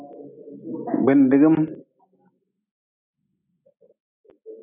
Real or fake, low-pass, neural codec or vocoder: real; 3.6 kHz; none